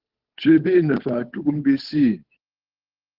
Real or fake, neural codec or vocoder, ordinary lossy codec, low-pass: fake; codec, 16 kHz, 8 kbps, FunCodec, trained on Chinese and English, 25 frames a second; Opus, 16 kbps; 5.4 kHz